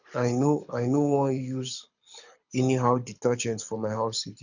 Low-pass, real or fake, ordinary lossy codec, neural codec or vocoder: 7.2 kHz; fake; none; codec, 24 kHz, 6 kbps, HILCodec